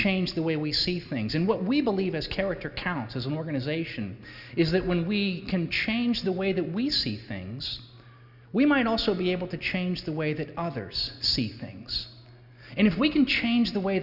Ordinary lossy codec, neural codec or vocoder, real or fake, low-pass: Opus, 64 kbps; none; real; 5.4 kHz